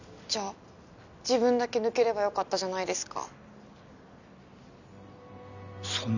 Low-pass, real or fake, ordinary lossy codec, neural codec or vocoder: 7.2 kHz; real; none; none